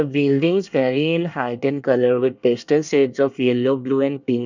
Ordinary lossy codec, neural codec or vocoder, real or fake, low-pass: none; codec, 24 kHz, 1 kbps, SNAC; fake; 7.2 kHz